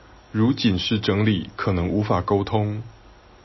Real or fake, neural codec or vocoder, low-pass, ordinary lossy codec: real; none; 7.2 kHz; MP3, 24 kbps